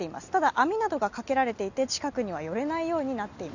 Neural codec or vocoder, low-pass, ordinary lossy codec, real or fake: none; 7.2 kHz; none; real